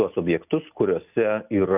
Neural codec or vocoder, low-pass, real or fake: none; 3.6 kHz; real